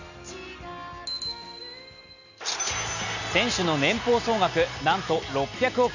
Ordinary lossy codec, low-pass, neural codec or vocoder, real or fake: none; 7.2 kHz; none; real